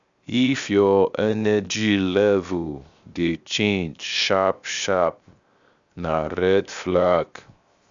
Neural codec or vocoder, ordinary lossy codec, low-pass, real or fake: codec, 16 kHz, 0.7 kbps, FocalCodec; Opus, 64 kbps; 7.2 kHz; fake